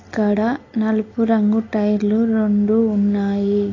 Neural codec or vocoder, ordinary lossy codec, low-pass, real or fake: none; AAC, 32 kbps; 7.2 kHz; real